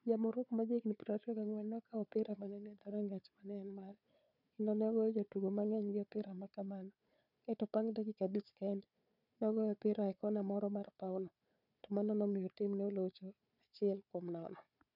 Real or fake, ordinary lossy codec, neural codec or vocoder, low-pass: fake; none; codec, 16 kHz, 4 kbps, FunCodec, trained on Chinese and English, 50 frames a second; 5.4 kHz